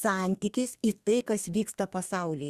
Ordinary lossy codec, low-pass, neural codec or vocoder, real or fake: Opus, 64 kbps; 14.4 kHz; codec, 32 kHz, 1.9 kbps, SNAC; fake